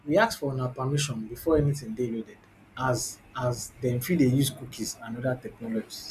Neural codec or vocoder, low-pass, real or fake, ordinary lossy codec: none; 14.4 kHz; real; none